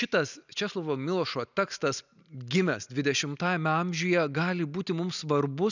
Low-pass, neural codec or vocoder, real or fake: 7.2 kHz; none; real